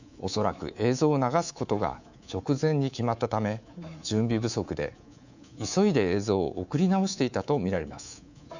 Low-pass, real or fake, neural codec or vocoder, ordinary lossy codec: 7.2 kHz; fake; codec, 24 kHz, 3.1 kbps, DualCodec; none